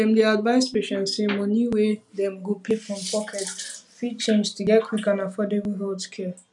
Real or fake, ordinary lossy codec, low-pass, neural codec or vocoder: real; none; 10.8 kHz; none